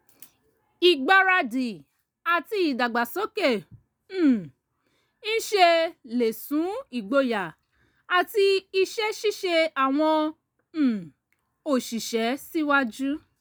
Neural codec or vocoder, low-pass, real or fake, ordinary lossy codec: none; none; real; none